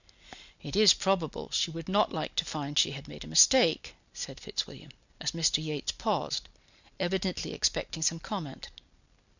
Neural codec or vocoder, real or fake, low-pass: none; real; 7.2 kHz